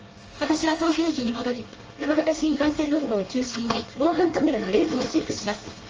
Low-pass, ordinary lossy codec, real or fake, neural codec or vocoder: 7.2 kHz; Opus, 24 kbps; fake; codec, 24 kHz, 1 kbps, SNAC